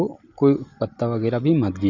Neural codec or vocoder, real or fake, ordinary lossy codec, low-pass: none; real; none; 7.2 kHz